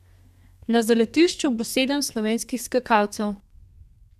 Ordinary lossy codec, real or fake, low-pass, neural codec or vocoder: none; fake; 14.4 kHz; codec, 32 kHz, 1.9 kbps, SNAC